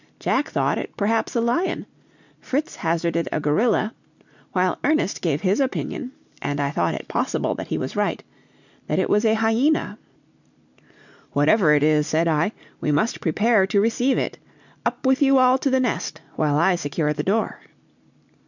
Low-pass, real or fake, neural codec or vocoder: 7.2 kHz; real; none